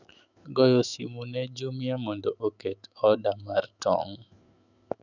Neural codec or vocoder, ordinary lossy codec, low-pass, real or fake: autoencoder, 48 kHz, 128 numbers a frame, DAC-VAE, trained on Japanese speech; none; 7.2 kHz; fake